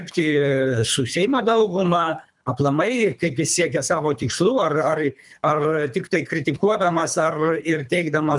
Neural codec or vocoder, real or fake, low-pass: codec, 24 kHz, 3 kbps, HILCodec; fake; 10.8 kHz